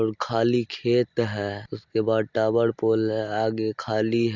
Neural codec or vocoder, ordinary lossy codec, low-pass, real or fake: none; Opus, 64 kbps; 7.2 kHz; real